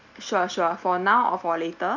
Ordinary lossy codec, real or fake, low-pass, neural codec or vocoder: none; real; 7.2 kHz; none